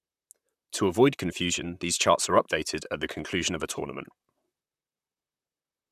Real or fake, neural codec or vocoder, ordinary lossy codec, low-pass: fake; vocoder, 44.1 kHz, 128 mel bands, Pupu-Vocoder; none; 14.4 kHz